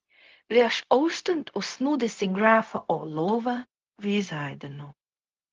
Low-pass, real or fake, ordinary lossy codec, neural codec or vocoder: 7.2 kHz; fake; Opus, 32 kbps; codec, 16 kHz, 0.4 kbps, LongCat-Audio-Codec